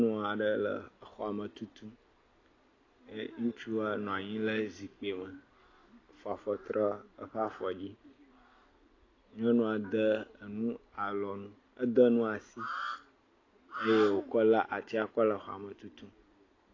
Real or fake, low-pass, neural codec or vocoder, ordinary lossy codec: real; 7.2 kHz; none; AAC, 32 kbps